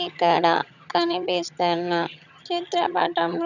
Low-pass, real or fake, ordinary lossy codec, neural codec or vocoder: 7.2 kHz; fake; none; vocoder, 22.05 kHz, 80 mel bands, HiFi-GAN